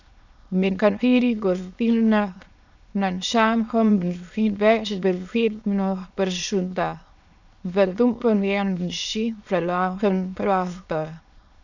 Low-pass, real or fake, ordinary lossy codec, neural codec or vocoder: 7.2 kHz; fake; none; autoencoder, 22.05 kHz, a latent of 192 numbers a frame, VITS, trained on many speakers